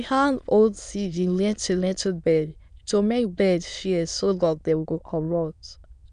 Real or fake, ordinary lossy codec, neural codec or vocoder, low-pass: fake; none; autoencoder, 22.05 kHz, a latent of 192 numbers a frame, VITS, trained on many speakers; 9.9 kHz